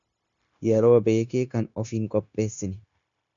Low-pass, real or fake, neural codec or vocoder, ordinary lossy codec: 7.2 kHz; fake; codec, 16 kHz, 0.9 kbps, LongCat-Audio-Codec; MP3, 96 kbps